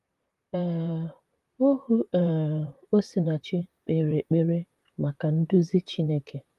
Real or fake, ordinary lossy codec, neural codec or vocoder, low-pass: fake; Opus, 32 kbps; vocoder, 44.1 kHz, 128 mel bands, Pupu-Vocoder; 14.4 kHz